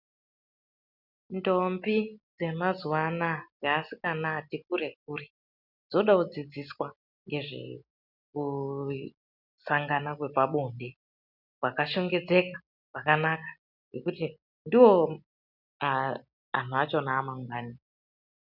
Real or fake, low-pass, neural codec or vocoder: real; 5.4 kHz; none